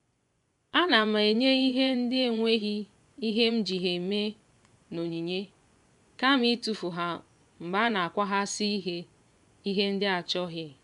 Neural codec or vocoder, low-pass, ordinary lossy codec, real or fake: vocoder, 24 kHz, 100 mel bands, Vocos; 10.8 kHz; none; fake